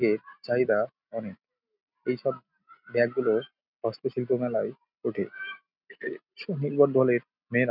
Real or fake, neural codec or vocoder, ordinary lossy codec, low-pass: real; none; none; 5.4 kHz